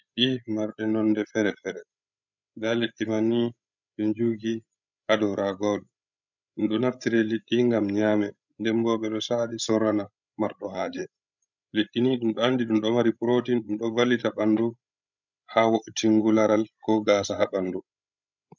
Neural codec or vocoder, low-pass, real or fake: codec, 16 kHz, 16 kbps, FreqCodec, larger model; 7.2 kHz; fake